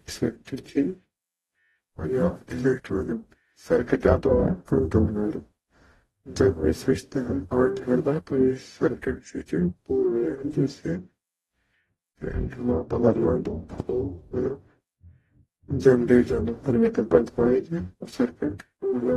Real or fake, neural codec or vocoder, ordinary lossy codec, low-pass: fake; codec, 44.1 kHz, 0.9 kbps, DAC; AAC, 32 kbps; 19.8 kHz